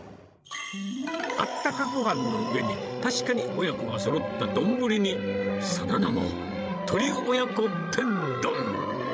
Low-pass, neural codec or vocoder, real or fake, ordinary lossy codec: none; codec, 16 kHz, 16 kbps, FreqCodec, larger model; fake; none